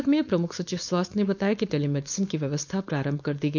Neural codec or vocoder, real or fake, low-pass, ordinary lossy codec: codec, 16 kHz, 4.8 kbps, FACodec; fake; 7.2 kHz; none